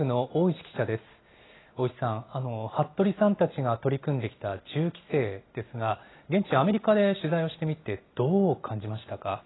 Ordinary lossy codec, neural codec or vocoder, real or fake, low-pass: AAC, 16 kbps; none; real; 7.2 kHz